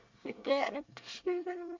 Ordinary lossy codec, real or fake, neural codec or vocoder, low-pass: MP3, 64 kbps; fake; codec, 24 kHz, 1 kbps, SNAC; 7.2 kHz